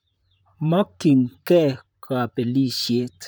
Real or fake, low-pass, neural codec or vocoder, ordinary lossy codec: fake; none; vocoder, 44.1 kHz, 128 mel bands, Pupu-Vocoder; none